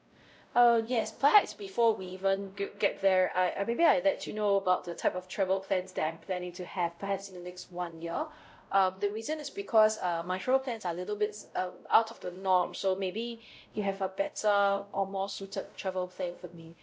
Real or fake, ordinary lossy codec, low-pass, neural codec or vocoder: fake; none; none; codec, 16 kHz, 0.5 kbps, X-Codec, WavLM features, trained on Multilingual LibriSpeech